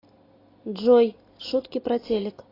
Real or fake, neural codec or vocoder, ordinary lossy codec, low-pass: real; none; AAC, 24 kbps; 5.4 kHz